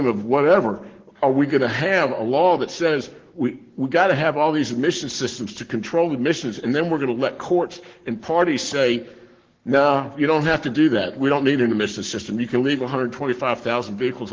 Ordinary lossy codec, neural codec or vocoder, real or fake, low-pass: Opus, 16 kbps; codec, 44.1 kHz, 7.8 kbps, Pupu-Codec; fake; 7.2 kHz